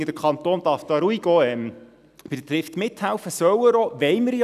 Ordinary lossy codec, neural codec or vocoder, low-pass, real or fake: none; none; 14.4 kHz; real